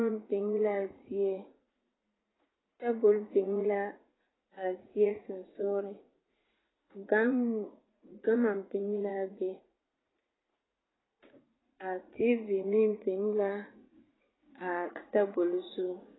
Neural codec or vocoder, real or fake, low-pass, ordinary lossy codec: vocoder, 44.1 kHz, 80 mel bands, Vocos; fake; 7.2 kHz; AAC, 16 kbps